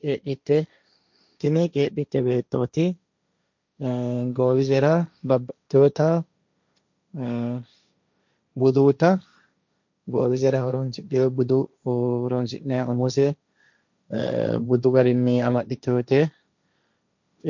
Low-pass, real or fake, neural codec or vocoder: 7.2 kHz; fake; codec, 16 kHz, 1.1 kbps, Voila-Tokenizer